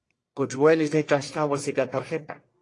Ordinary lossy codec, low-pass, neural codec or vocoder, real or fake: AAC, 48 kbps; 10.8 kHz; codec, 44.1 kHz, 1.7 kbps, Pupu-Codec; fake